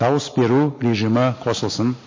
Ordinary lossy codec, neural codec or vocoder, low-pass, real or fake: MP3, 32 kbps; none; 7.2 kHz; real